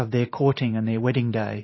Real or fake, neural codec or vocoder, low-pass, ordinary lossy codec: fake; vocoder, 44.1 kHz, 128 mel bands every 512 samples, BigVGAN v2; 7.2 kHz; MP3, 24 kbps